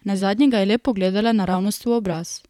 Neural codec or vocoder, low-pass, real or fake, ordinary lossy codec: vocoder, 44.1 kHz, 128 mel bands, Pupu-Vocoder; 19.8 kHz; fake; none